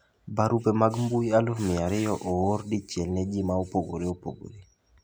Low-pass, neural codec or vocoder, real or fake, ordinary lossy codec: none; none; real; none